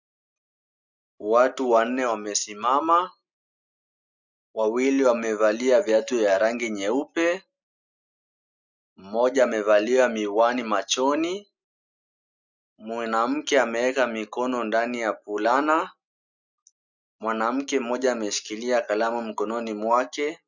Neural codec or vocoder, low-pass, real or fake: none; 7.2 kHz; real